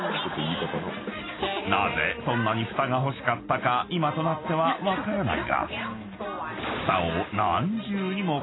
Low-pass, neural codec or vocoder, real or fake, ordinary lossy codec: 7.2 kHz; none; real; AAC, 16 kbps